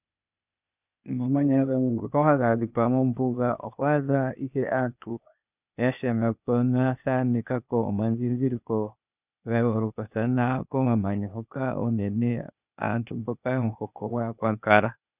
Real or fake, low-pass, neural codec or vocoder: fake; 3.6 kHz; codec, 16 kHz, 0.8 kbps, ZipCodec